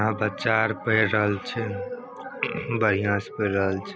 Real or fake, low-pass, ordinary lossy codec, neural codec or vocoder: real; none; none; none